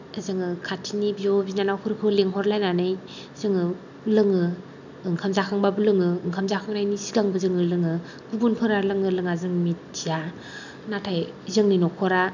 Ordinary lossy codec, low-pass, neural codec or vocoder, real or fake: none; 7.2 kHz; none; real